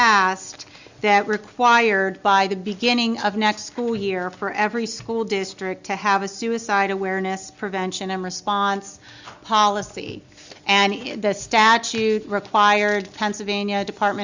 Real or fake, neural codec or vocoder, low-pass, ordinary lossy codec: real; none; 7.2 kHz; Opus, 64 kbps